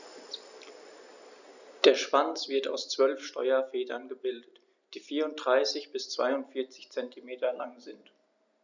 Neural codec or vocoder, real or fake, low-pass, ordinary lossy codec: none; real; 7.2 kHz; none